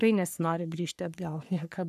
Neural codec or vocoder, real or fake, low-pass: codec, 44.1 kHz, 3.4 kbps, Pupu-Codec; fake; 14.4 kHz